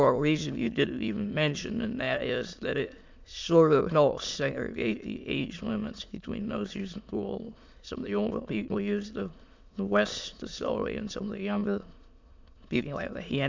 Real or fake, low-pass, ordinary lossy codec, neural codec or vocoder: fake; 7.2 kHz; MP3, 64 kbps; autoencoder, 22.05 kHz, a latent of 192 numbers a frame, VITS, trained on many speakers